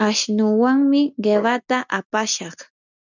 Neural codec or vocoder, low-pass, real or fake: none; 7.2 kHz; real